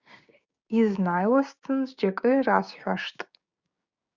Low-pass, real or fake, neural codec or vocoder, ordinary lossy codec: 7.2 kHz; fake; codec, 16 kHz, 6 kbps, DAC; Opus, 64 kbps